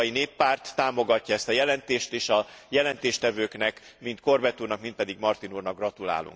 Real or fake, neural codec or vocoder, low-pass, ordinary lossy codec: real; none; none; none